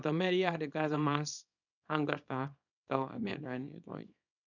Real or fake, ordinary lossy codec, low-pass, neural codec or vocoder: fake; none; 7.2 kHz; codec, 24 kHz, 0.9 kbps, WavTokenizer, small release